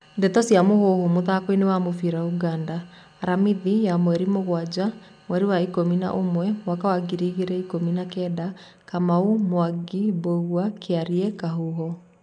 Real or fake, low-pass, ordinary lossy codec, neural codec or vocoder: real; 9.9 kHz; none; none